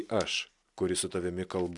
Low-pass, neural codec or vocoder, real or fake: 10.8 kHz; none; real